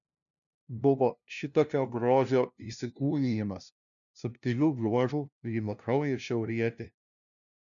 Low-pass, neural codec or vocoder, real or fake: 7.2 kHz; codec, 16 kHz, 0.5 kbps, FunCodec, trained on LibriTTS, 25 frames a second; fake